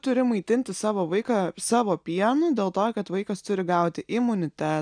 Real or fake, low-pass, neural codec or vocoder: real; 9.9 kHz; none